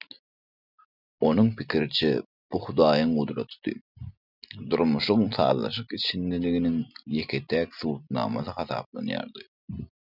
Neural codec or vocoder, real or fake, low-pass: none; real; 5.4 kHz